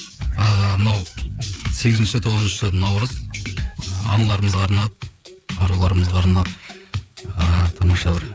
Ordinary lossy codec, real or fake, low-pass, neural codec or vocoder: none; fake; none; codec, 16 kHz, 4 kbps, FreqCodec, larger model